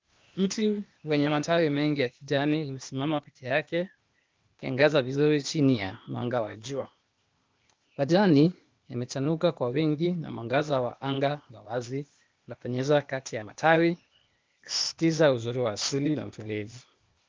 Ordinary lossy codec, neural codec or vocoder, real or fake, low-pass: Opus, 32 kbps; codec, 16 kHz, 0.8 kbps, ZipCodec; fake; 7.2 kHz